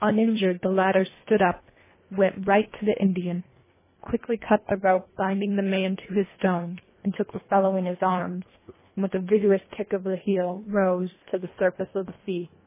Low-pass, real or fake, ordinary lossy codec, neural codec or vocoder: 3.6 kHz; fake; MP3, 16 kbps; codec, 24 kHz, 1.5 kbps, HILCodec